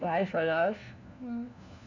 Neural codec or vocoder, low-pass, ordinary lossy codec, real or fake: codec, 16 kHz, 1 kbps, FunCodec, trained on LibriTTS, 50 frames a second; 7.2 kHz; none; fake